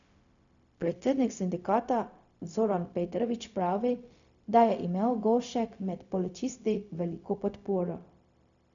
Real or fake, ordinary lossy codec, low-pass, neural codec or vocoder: fake; none; 7.2 kHz; codec, 16 kHz, 0.4 kbps, LongCat-Audio-Codec